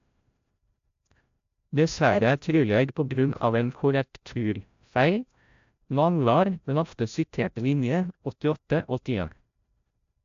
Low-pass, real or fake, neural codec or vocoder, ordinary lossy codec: 7.2 kHz; fake; codec, 16 kHz, 0.5 kbps, FreqCodec, larger model; AAC, 64 kbps